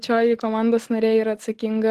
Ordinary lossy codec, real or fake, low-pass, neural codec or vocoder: Opus, 16 kbps; real; 14.4 kHz; none